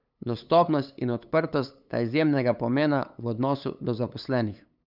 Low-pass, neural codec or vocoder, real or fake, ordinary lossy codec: 5.4 kHz; codec, 16 kHz, 8 kbps, FunCodec, trained on LibriTTS, 25 frames a second; fake; none